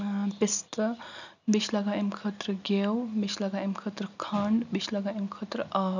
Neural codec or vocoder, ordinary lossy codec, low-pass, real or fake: none; none; 7.2 kHz; real